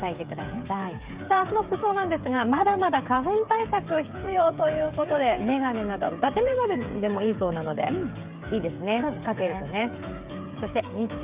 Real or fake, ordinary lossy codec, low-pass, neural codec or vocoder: fake; Opus, 64 kbps; 3.6 kHz; codec, 16 kHz, 8 kbps, FreqCodec, smaller model